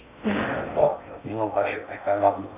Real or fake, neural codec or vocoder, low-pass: fake; codec, 16 kHz in and 24 kHz out, 0.6 kbps, FocalCodec, streaming, 4096 codes; 3.6 kHz